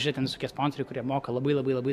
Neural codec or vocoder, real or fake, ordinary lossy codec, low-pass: none; real; MP3, 96 kbps; 14.4 kHz